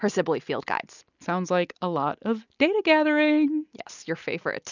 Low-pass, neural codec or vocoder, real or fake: 7.2 kHz; none; real